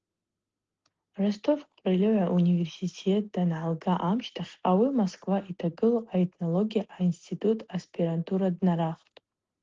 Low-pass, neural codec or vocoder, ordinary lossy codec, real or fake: 7.2 kHz; none; Opus, 16 kbps; real